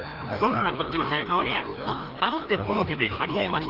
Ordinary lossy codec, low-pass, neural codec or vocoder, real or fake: Opus, 16 kbps; 5.4 kHz; codec, 16 kHz, 1 kbps, FreqCodec, larger model; fake